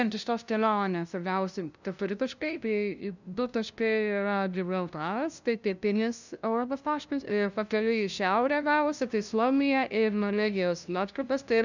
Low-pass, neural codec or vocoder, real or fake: 7.2 kHz; codec, 16 kHz, 0.5 kbps, FunCodec, trained on LibriTTS, 25 frames a second; fake